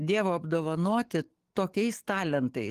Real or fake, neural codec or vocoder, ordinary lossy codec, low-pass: fake; codec, 44.1 kHz, 7.8 kbps, DAC; Opus, 24 kbps; 14.4 kHz